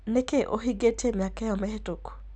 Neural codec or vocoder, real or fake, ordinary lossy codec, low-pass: none; real; none; 9.9 kHz